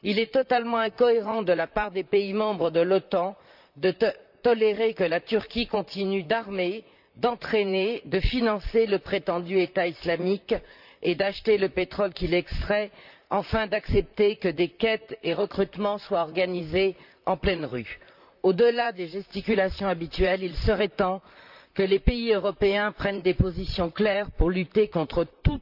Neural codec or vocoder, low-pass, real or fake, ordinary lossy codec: vocoder, 44.1 kHz, 128 mel bands, Pupu-Vocoder; 5.4 kHz; fake; none